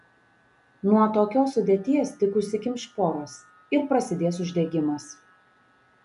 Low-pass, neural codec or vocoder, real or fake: 10.8 kHz; none; real